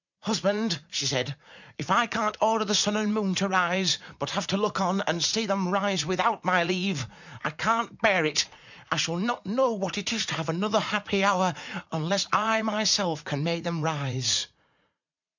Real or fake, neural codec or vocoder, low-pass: fake; vocoder, 44.1 kHz, 80 mel bands, Vocos; 7.2 kHz